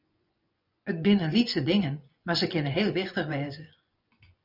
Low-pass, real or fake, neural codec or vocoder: 5.4 kHz; fake; vocoder, 24 kHz, 100 mel bands, Vocos